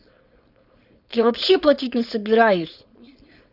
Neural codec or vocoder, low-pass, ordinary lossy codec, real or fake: codec, 16 kHz, 4.8 kbps, FACodec; 5.4 kHz; Opus, 64 kbps; fake